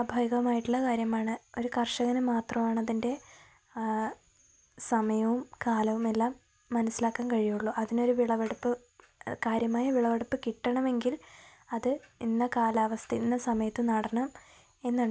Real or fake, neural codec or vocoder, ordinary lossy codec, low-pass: real; none; none; none